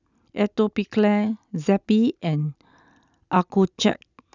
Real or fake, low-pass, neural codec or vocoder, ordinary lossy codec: real; 7.2 kHz; none; none